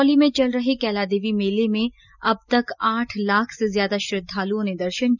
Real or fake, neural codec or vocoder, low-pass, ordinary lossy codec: real; none; 7.2 kHz; none